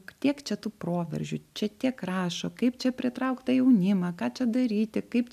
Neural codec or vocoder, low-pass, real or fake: none; 14.4 kHz; real